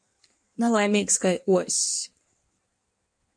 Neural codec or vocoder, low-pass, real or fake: codec, 16 kHz in and 24 kHz out, 1.1 kbps, FireRedTTS-2 codec; 9.9 kHz; fake